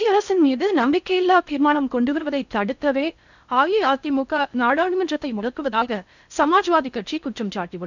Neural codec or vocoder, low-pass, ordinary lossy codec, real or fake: codec, 16 kHz in and 24 kHz out, 0.6 kbps, FocalCodec, streaming, 4096 codes; 7.2 kHz; none; fake